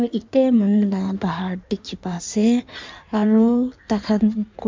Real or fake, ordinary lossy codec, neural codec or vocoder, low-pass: fake; MP3, 64 kbps; codec, 16 kHz in and 24 kHz out, 1.1 kbps, FireRedTTS-2 codec; 7.2 kHz